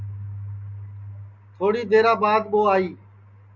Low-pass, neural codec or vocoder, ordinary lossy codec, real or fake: 7.2 kHz; none; Opus, 64 kbps; real